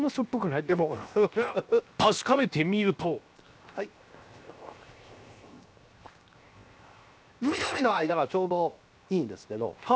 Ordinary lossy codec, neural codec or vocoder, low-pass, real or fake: none; codec, 16 kHz, 0.7 kbps, FocalCodec; none; fake